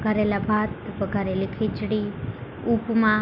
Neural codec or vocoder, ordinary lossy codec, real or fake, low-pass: none; none; real; 5.4 kHz